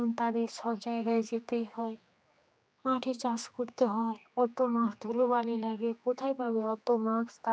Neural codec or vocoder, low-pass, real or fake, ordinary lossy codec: codec, 16 kHz, 2 kbps, X-Codec, HuBERT features, trained on general audio; none; fake; none